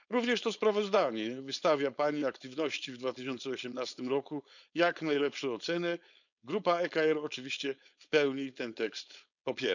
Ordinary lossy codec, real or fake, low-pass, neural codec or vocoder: none; fake; 7.2 kHz; codec, 16 kHz, 4.8 kbps, FACodec